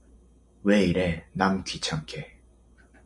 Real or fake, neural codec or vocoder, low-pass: real; none; 10.8 kHz